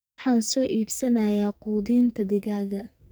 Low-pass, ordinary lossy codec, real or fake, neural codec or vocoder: none; none; fake; codec, 44.1 kHz, 2.6 kbps, SNAC